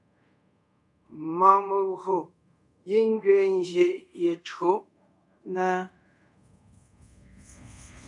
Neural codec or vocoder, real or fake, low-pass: codec, 24 kHz, 0.5 kbps, DualCodec; fake; 10.8 kHz